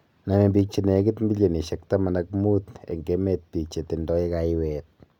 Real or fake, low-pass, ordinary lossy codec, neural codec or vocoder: real; 19.8 kHz; none; none